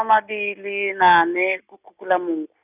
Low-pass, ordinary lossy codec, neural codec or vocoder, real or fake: 3.6 kHz; none; none; real